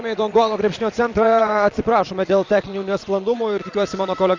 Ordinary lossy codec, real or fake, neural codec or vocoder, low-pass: MP3, 64 kbps; fake; vocoder, 22.05 kHz, 80 mel bands, Vocos; 7.2 kHz